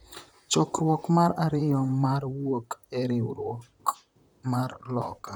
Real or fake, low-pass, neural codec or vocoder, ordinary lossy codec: fake; none; vocoder, 44.1 kHz, 128 mel bands, Pupu-Vocoder; none